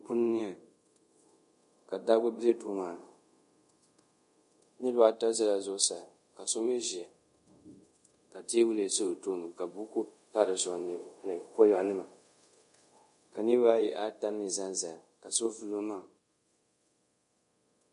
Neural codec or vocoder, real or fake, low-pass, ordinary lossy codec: codec, 24 kHz, 0.5 kbps, DualCodec; fake; 10.8 kHz; MP3, 48 kbps